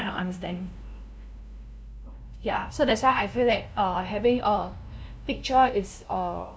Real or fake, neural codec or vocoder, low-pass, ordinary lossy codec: fake; codec, 16 kHz, 0.5 kbps, FunCodec, trained on LibriTTS, 25 frames a second; none; none